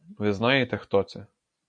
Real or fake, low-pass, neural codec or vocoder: real; 9.9 kHz; none